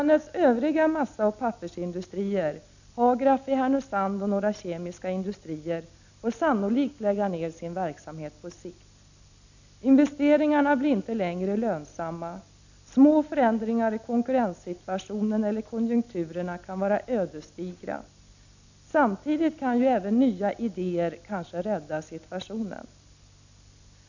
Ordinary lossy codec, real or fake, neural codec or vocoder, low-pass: none; real; none; 7.2 kHz